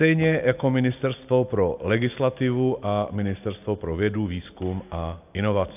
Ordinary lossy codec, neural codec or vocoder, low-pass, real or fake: AAC, 32 kbps; none; 3.6 kHz; real